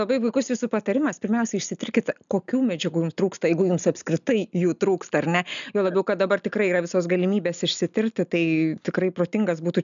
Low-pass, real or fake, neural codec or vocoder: 7.2 kHz; real; none